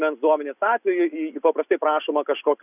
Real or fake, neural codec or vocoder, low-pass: real; none; 3.6 kHz